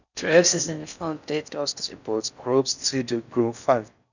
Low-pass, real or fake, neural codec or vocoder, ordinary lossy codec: 7.2 kHz; fake; codec, 16 kHz in and 24 kHz out, 0.6 kbps, FocalCodec, streaming, 4096 codes; none